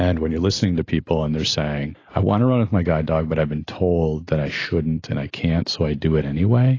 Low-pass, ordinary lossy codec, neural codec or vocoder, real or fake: 7.2 kHz; AAC, 32 kbps; none; real